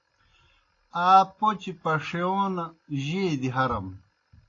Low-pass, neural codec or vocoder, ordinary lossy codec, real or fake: 7.2 kHz; none; AAC, 32 kbps; real